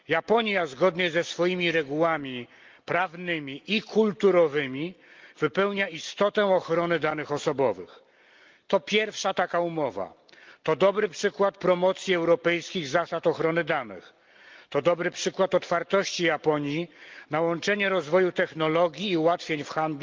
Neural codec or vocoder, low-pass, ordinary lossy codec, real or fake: none; 7.2 kHz; Opus, 32 kbps; real